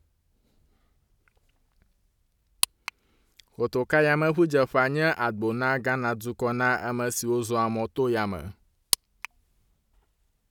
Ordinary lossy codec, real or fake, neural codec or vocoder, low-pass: none; real; none; 19.8 kHz